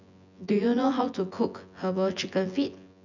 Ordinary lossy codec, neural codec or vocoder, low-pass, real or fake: none; vocoder, 24 kHz, 100 mel bands, Vocos; 7.2 kHz; fake